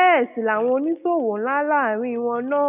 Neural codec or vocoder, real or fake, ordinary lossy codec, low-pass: none; real; none; 3.6 kHz